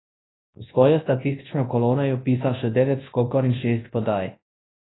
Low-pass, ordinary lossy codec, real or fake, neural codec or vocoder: 7.2 kHz; AAC, 16 kbps; fake; codec, 24 kHz, 0.9 kbps, WavTokenizer, large speech release